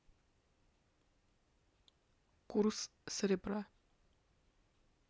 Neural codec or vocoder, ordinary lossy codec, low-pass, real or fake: none; none; none; real